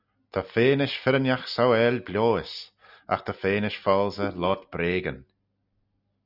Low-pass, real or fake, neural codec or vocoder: 5.4 kHz; real; none